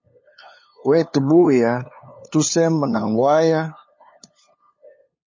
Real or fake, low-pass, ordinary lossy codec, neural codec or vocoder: fake; 7.2 kHz; MP3, 32 kbps; codec, 16 kHz, 2 kbps, FunCodec, trained on LibriTTS, 25 frames a second